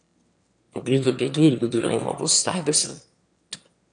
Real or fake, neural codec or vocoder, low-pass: fake; autoencoder, 22.05 kHz, a latent of 192 numbers a frame, VITS, trained on one speaker; 9.9 kHz